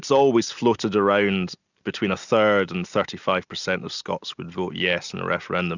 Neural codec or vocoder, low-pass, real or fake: none; 7.2 kHz; real